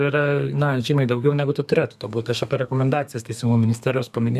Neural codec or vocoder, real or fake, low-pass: codec, 32 kHz, 1.9 kbps, SNAC; fake; 14.4 kHz